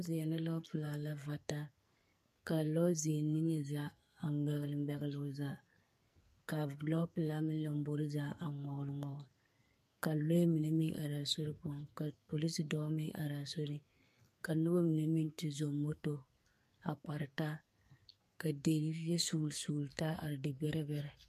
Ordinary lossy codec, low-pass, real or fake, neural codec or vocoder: MP3, 64 kbps; 14.4 kHz; fake; codec, 44.1 kHz, 2.6 kbps, SNAC